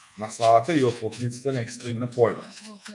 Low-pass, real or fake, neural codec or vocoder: 10.8 kHz; fake; codec, 24 kHz, 1.2 kbps, DualCodec